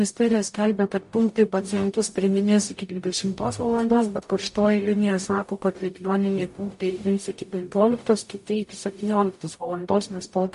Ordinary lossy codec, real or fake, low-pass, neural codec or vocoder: MP3, 48 kbps; fake; 14.4 kHz; codec, 44.1 kHz, 0.9 kbps, DAC